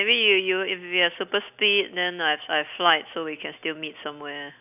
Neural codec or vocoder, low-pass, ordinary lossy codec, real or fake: none; 3.6 kHz; none; real